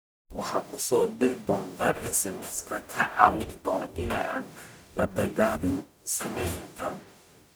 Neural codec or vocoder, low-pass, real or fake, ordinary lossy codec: codec, 44.1 kHz, 0.9 kbps, DAC; none; fake; none